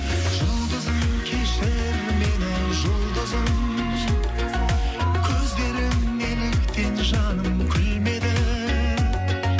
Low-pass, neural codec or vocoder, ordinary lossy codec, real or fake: none; none; none; real